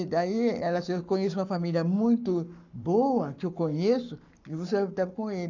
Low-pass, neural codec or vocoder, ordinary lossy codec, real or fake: 7.2 kHz; codec, 44.1 kHz, 7.8 kbps, Pupu-Codec; none; fake